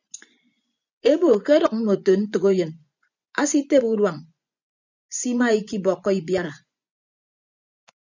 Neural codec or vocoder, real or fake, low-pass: vocoder, 24 kHz, 100 mel bands, Vocos; fake; 7.2 kHz